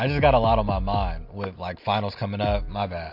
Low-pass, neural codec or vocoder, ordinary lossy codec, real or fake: 5.4 kHz; none; MP3, 48 kbps; real